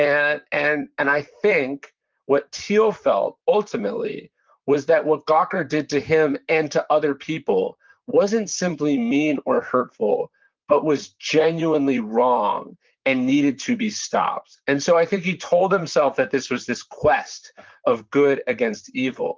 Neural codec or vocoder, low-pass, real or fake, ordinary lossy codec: vocoder, 44.1 kHz, 128 mel bands, Pupu-Vocoder; 7.2 kHz; fake; Opus, 32 kbps